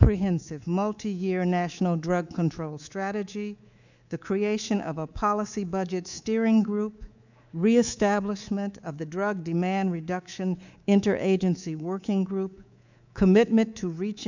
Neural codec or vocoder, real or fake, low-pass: codec, 24 kHz, 3.1 kbps, DualCodec; fake; 7.2 kHz